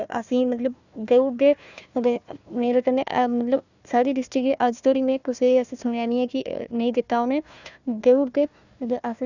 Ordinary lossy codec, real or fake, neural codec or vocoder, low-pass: none; fake; codec, 16 kHz, 1 kbps, FunCodec, trained on Chinese and English, 50 frames a second; 7.2 kHz